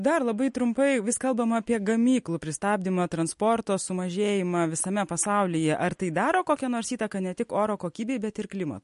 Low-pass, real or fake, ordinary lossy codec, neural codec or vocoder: 10.8 kHz; real; MP3, 48 kbps; none